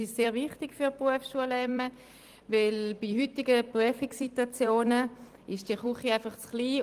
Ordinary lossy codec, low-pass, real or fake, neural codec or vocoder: Opus, 24 kbps; 14.4 kHz; fake; vocoder, 44.1 kHz, 128 mel bands every 256 samples, BigVGAN v2